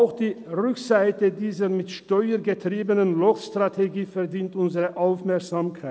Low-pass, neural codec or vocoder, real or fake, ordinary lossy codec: none; none; real; none